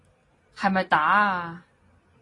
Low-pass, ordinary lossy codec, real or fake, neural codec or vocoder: 10.8 kHz; AAC, 32 kbps; real; none